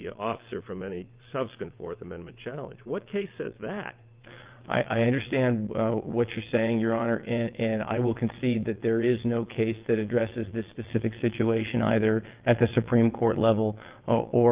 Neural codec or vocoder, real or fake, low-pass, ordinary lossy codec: vocoder, 22.05 kHz, 80 mel bands, WaveNeXt; fake; 3.6 kHz; Opus, 32 kbps